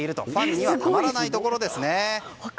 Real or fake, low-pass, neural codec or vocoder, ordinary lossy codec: real; none; none; none